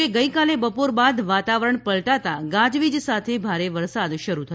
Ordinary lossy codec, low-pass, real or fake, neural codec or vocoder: none; none; real; none